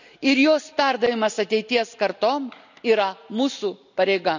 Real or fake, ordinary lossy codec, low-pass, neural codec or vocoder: real; none; 7.2 kHz; none